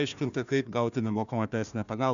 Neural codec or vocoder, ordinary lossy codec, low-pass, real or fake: codec, 16 kHz, 1 kbps, FunCodec, trained on Chinese and English, 50 frames a second; MP3, 96 kbps; 7.2 kHz; fake